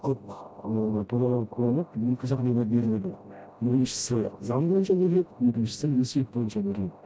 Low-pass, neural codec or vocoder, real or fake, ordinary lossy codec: none; codec, 16 kHz, 0.5 kbps, FreqCodec, smaller model; fake; none